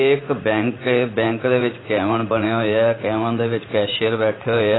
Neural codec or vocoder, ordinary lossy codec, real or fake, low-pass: vocoder, 44.1 kHz, 80 mel bands, Vocos; AAC, 16 kbps; fake; 7.2 kHz